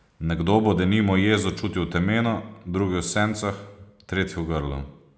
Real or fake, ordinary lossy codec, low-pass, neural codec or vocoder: real; none; none; none